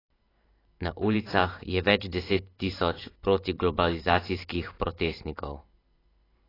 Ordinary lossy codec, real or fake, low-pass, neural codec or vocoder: AAC, 24 kbps; fake; 5.4 kHz; vocoder, 44.1 kHz, 128 mel bands every 512 samples, BigVGAN v2